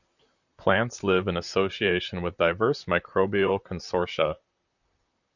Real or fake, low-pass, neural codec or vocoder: fake; 7.2 kHz; vocoder, 44.1 kHz, 128 mel bands, Pupu-Vocoder